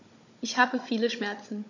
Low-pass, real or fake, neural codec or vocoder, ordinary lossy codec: 7.2 kHz; fake; codec, 16 kHz, 16 kbps, FunCodec, trained on Chinese and English, 50 frames a second; MP3, 48 kbps